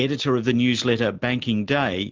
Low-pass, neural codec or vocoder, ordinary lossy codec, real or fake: 7.2 kHz; none; Opus, 32 kbps; real